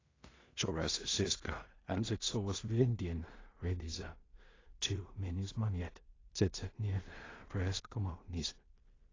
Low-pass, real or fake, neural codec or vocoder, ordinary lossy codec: 7.2 kHz; fake; codec, 16 kHz in and 24 kHz out, 0.4 kbps, LongCat-Audio-Codec, two codebook decoder; AAC, 32 kbps